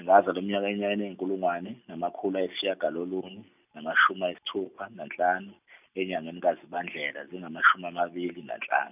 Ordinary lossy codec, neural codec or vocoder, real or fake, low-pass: none; none; real; 3.6 kHz